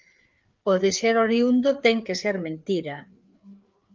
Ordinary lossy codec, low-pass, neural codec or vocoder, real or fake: Opus, 32 kbps; 7.2 kHz; codec, 16 kHz, 4 kbps, FreqCodec, larger model; fake